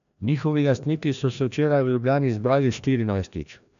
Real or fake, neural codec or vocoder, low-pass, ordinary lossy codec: fake; codec, 16 kHz, 1 kbps, FreqCodec, larger model; 7.2 kHz; none